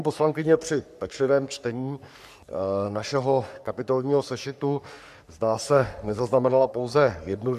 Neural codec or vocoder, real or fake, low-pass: codec, 44.1 kHz, 3.4 kbps, Pupu-Codec; fake; 14.4 kHz